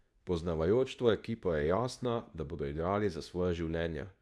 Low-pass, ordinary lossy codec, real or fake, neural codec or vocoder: none; none; fake; codec, 24 kHz, 0.9 kbps, WavTokenizer, medium speech release version 2